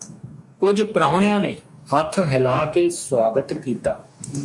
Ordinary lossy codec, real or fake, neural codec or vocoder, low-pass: MP3, 64 kbps; fake; codec, 44.1 kHz, 2.6 kbps, DAC; 10.8 kHz